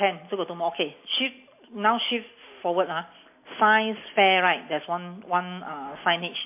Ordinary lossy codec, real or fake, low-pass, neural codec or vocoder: MP3, 24 kbps; real; 3.6 kHz; none